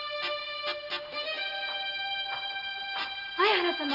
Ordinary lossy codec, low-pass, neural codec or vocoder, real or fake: none; 5.4 kHz; none; real